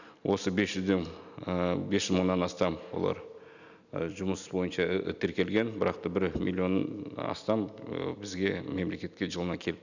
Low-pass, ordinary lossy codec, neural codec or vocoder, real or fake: 7.2 kHz; none; none; real